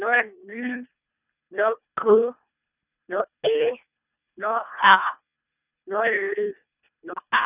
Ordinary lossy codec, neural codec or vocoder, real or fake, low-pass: none; codec, 24 kHz, 1.5 kbps, HILCodec; fake; 3.6 kHz